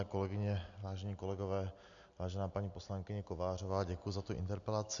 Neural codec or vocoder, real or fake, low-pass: none; real; 7.2 kHz